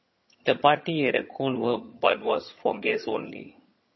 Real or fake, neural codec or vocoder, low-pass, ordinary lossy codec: fake; vocoder, 22.05 kHz, 80 mel bands, HiFi-GAN; 7.2 kHz; MP3, 24 kbps